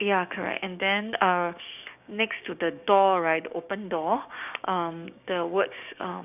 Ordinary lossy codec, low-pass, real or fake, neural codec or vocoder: none; 3.6 kHz; fake; codec, 16 kHz, 6 kbps, DAC